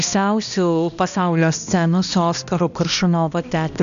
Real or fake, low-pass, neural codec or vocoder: fake; 7.2 kHz; codec, 16 kHz, 1 kbps, X-Codec, HuBERT features, trained on balanced general audio